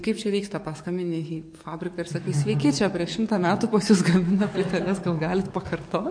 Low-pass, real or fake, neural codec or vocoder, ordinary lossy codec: 9.9 kHz; fake; codec, 44.1 kHz, 7.8 kbps, DAC; MP3, 48 kbps